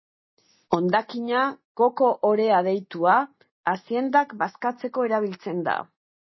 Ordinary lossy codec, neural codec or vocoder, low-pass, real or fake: MP3, 24 kbps; none; 7.2 kHz; real